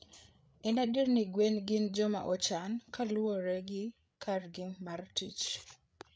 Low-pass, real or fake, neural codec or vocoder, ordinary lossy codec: none; fake; codec, 16 kHz, 8 kbps, FreqCodec, larger model; none